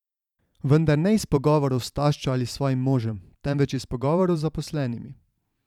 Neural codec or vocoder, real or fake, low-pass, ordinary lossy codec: vocoder, 44.1 kHz, 128 mel bands every 512 samples, BigVGAN v2; fake; 19.8 kHz; none